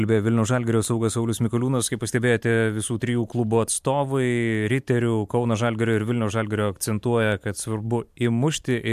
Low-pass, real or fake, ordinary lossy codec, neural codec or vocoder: 14.4 kHz; fake; MP3, 96 kbps; vocoder, 44.1 kHz, 128 mel bands every 512 samples, BigVGAN v2